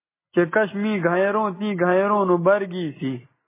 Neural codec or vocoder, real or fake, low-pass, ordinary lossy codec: none; real; 3.6 kHz; MP3, 16 kbps